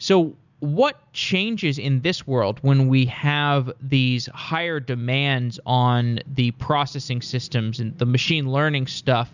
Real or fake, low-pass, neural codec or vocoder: real; 7.2 kHz; none